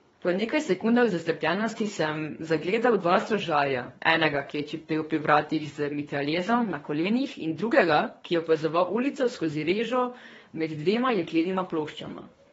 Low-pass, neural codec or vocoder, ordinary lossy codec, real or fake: 10.8 kHz; codec, 24 kHz, 3 kbps, HILCodec; AAC, 24 kbps; fake